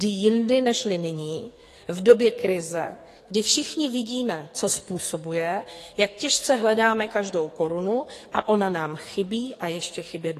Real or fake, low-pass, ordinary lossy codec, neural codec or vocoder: fake; 14.4 kHz; AAC, 48 kbps; codec, 44.1 kHz, 2.6 kbps, SNAC